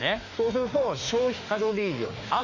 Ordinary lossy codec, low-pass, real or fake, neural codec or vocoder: none; 7.2 kHz; fake; autoencoder, 48 kHz, 32 numbers a frame, DAC-VAE, trained on Japanese speech